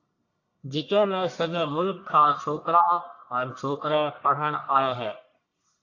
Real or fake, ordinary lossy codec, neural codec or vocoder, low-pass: fake; AAC, 48 kbps; codec, 44.1 kHz, 1.7 kbps, Pupu-Codec; 7.2 kHz